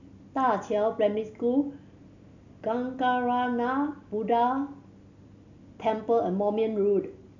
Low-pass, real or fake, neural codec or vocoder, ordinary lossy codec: 7.2 kHz; real; none; none